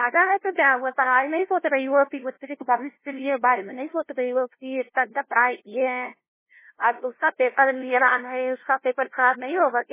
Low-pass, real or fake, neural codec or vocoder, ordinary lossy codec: 3.6 kHz; fake; codec, 16 kHz, 0.5 kbps, FunCodec, trained on LibriTTS, 25 frames a second; MP3, 16 kbps